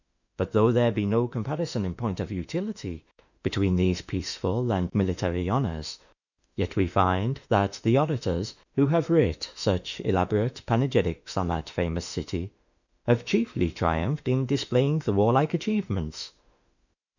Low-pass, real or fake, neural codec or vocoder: 7.2 kHz; fake; autoencoder, 48 kHz, 32 numbers a frame, DAC-VAE, trained on Japanese speech